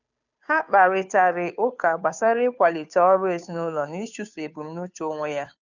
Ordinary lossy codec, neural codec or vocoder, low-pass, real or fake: none; codec, 16 kHz, 2 kbps, FunCodec, trained on Chinese and English, 25 frames a second; 7.2 kHz; fake